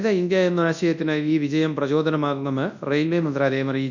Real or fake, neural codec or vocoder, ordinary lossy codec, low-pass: fake; codec, 24 kHz, 0.9 kbps, WavTokenizer, large speech release; none; 7.2 kHz